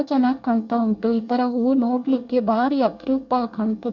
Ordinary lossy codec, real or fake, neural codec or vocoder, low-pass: MP3, 64 kbps; fake; codec, 24 kHz, 1 kbps, SNAC; 7.2 kHz